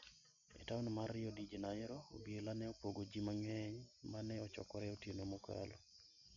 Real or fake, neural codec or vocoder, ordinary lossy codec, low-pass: real; none; none; 7.2 kHz